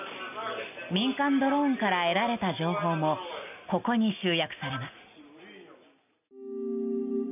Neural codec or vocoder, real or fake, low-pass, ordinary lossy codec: none; real; 3.6 kHz; none